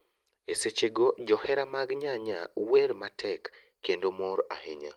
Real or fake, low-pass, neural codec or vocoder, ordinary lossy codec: real; 19.8 kHz; none; Opus, 32 kbps